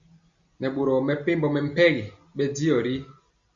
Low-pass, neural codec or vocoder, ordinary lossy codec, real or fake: 7.2 kHz; none; Opus, 64 kbps; real